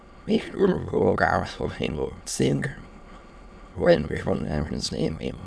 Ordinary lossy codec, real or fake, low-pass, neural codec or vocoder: none; fake; none; autoencoder, 22.05 kHz, a latent of 192 numbers a frame, VITS, trained on many speakers